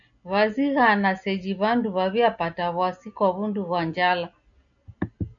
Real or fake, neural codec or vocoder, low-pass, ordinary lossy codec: real; none; 7.2 kHz; MP3, 96 kbps